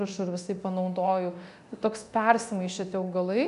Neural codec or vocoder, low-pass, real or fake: codec, 24 kHz, 0.9 kbps, DualCodec; 10.8 kHz; fake